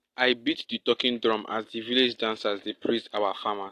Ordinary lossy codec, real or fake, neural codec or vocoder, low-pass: AAC, 48 kbps; real; none; 14.4 kHz